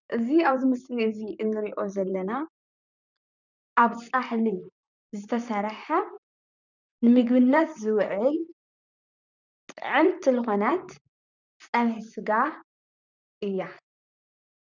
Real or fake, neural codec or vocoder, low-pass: fake; vocoder, 44.1 kHz, 128 mel bands, Pupu-Vocoder; 7.2 kHz